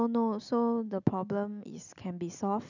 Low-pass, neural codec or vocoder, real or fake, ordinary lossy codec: 7.2 kHz; none; real; none